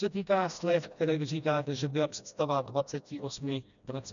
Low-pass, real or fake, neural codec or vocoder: 7.2 kHz; fake; codec, 16 kHz, 1 kbps, FreqCodec, smaller model